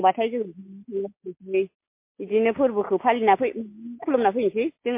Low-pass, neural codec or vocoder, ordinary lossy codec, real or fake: 3.6 kHz; none; MP3, 24 kbps; real